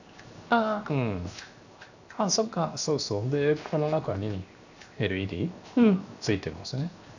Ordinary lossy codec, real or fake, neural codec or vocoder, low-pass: none; fake; codec, 16 kHz, 0.7 kbps, FocalCodec; 7.2 kHz